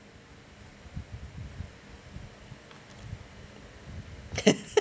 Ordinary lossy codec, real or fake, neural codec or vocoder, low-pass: none; real; none; none